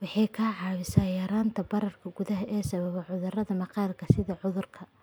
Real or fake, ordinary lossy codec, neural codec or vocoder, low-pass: real; none; none; none